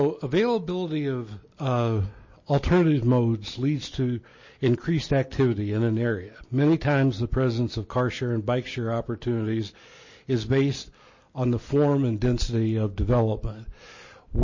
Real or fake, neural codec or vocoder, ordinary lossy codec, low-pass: real; none; MP3, 32 kbps; 7.2 kHz